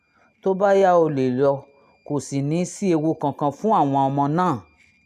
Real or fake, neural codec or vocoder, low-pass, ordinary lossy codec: real; none; 14.4 kHz; none